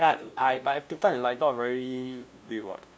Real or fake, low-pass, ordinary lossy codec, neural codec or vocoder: fake; none; none; codec, 16 kHz, 0.5 kbps, FunCodec, trained on LibriTTS, 25 frames a second